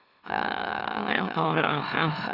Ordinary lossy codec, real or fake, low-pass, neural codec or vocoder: none; fake; 5.4 kHz; autoencoder, 44.1 kHz, a latent of 192 numbers a frame, MeloTTS